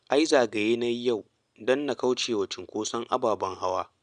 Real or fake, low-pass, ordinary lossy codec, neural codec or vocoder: real; 9.9 kHz; Opus, 64 kbps; none